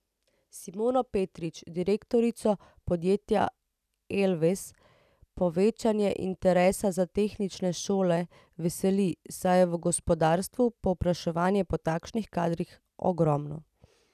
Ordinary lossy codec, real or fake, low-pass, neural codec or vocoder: none; real; 14.4 kHz; none